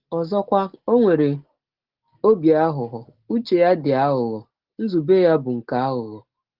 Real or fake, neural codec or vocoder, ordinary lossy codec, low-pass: real; none; Opus, 16 kbps; 5.4 kHz